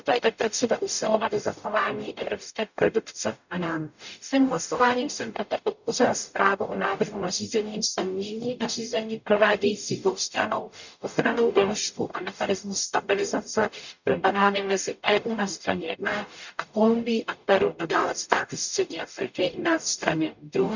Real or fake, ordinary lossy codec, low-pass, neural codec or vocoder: fake; none; 7.2 kHz; codec, 44.1 kHz, 0.9 kbps, DAC